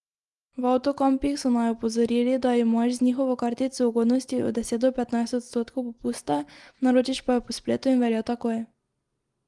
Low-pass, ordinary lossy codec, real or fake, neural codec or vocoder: 10.8 kHz; Opus, 24 kbps; real; none